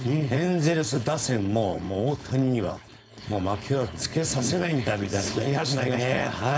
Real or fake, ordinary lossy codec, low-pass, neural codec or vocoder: fake; none; none; codec, 16 kHz, 4.8 kbps, FACodec